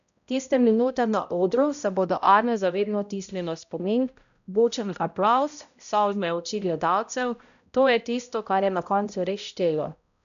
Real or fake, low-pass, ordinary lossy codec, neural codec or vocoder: fake; 7.2 kHz; none; codec, 16 kHz, 0.5 kbps, X-Codec, HuBERT features, trained on balanced general audio